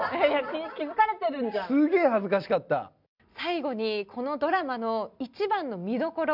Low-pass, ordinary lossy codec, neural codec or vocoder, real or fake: 5.4 kHz; none; none; real